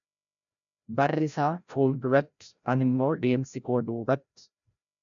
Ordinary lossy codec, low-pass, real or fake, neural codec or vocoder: MP3, 96 kbps; 7.2 kHz; fake; codec, 16 kHz, 0.5 kbps, FreqCodec, larger model